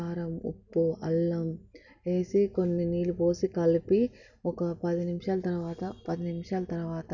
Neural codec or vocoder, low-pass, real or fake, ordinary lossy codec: none; 7.2 kHz; real; none